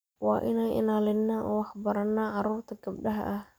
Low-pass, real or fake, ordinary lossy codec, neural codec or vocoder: none; real; none; none